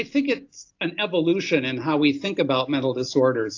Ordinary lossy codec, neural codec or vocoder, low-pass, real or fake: AAC, 48 kbps; none; 7.2 kHz; real